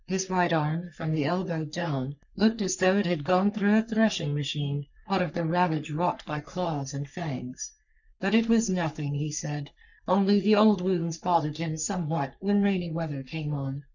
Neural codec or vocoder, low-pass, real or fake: codec, 44.1 kHz, 3.4 kbps, Pupu-Codec; 7.2 kHz; fake